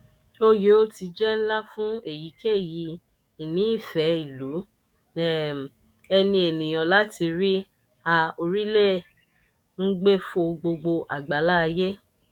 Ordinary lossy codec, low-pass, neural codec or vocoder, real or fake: none; 19.8 kHz; codec, 44.1 kHz, 7.8 kbps, DAC; fake